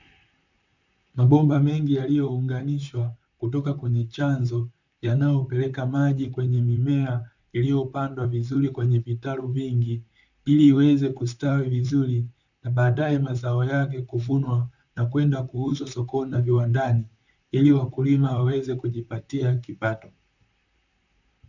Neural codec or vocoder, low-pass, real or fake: vocoder, 44.1 kHz, 128 mel bands, Pupu-Vocoder; 7.2 kHz; fake